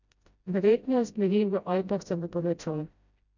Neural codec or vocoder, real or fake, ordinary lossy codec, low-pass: codec, 16 kHz, 0.5 kbps, FreqCodec, smaller model; fake; none; 7.2 kHz